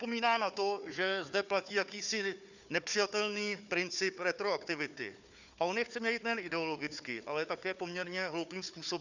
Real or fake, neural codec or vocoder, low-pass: fake; codec, 16 kHz, 4 kbps, FunCodec, trained on Chinese and English, 50 frames a second; 7.2 kHz